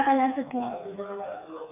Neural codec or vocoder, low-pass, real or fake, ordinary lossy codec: codec, 16 kHz, 4 kbps, FreqCodec, smaller model; 3.6 kHz; fake; none